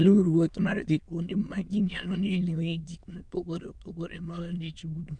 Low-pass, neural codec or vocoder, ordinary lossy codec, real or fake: 9.9 kHz; autoencoder, 22.05 kHz, a latent of 192 numbers a frame, VITS, trained on many speakers; MP3, 96 kbps; fake